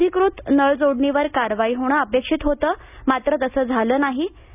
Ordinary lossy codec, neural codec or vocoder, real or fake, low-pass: none; none; real; 3.6 kHz